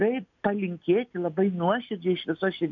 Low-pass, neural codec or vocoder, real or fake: 7.2 kHz; none; real